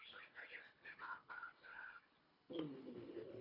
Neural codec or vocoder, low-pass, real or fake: codec, 24 kHz, 1.5 kbps, HILCodec; 5.4 kHz; fake